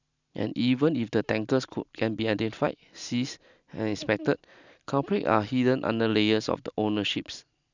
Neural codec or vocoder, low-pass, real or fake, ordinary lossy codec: none; 7.2 kHz; real; none